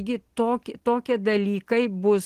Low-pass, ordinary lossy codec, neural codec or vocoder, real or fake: 14.4 kHz; Opus, 16 kbps; none; real